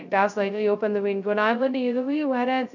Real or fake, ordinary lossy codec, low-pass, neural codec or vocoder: fake; none; 7.2 kHz; codec, 16 kHz, 0.2 kbps, FocalCodec